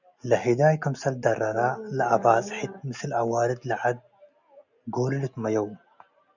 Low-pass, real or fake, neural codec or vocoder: 7.2 kHz; real; none